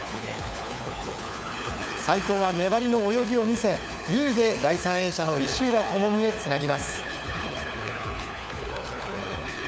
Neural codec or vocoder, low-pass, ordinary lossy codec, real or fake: codec, 16 kHz, 4 kbps, FunCodec, trained on LibriTTS, 50 frames a second; none; none; fake